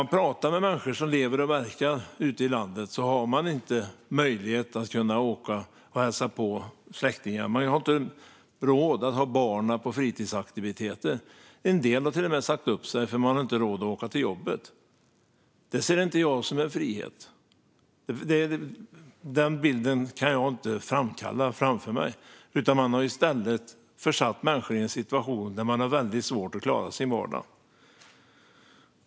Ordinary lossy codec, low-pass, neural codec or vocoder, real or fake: none; none; none; real